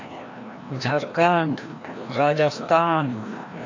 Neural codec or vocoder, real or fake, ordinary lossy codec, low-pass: codec, 16 kHz, 1 kbps, FreqCodec, larger model; fake; AAC, 48 kbps; 7.2 kHz